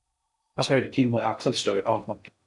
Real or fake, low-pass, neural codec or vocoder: fake; 10.8 kHz; codec, 16 kHz in and 24 kHz out, 0.6 kbps, FocalCodec, streaming, 4096 codes